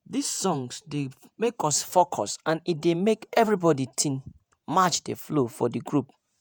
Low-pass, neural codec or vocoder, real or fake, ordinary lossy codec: none; vocoder, 48 kHz, 128 mel bands, Vocos; fake; none